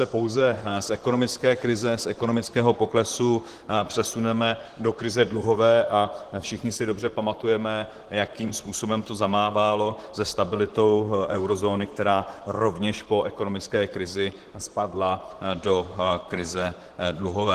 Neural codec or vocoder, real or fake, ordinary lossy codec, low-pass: vocoder, 44.1 kHz, 128 mel bands, Pupu-Vocoder; fake; Opus, 16 kbps; 14.4 kHz